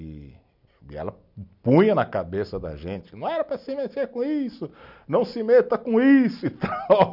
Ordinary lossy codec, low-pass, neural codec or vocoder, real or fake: none; 5.4 kHz; none; real